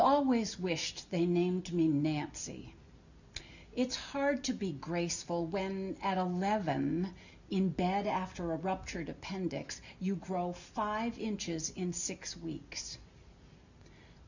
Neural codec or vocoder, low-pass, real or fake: none; 7.2 kHz; real